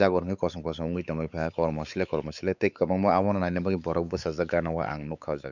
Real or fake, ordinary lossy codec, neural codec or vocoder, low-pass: fake; none; codec, 16 kHz, 4 kbps, X-Codec, WavLM features, trained on Multilingual LibriSpeech; 7.2 kHz